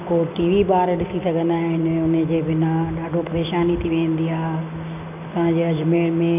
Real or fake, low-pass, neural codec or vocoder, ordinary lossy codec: real; 3.6 kHz; none; none